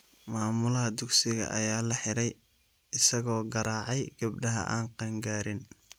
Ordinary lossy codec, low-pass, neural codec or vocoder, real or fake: none; none; none; real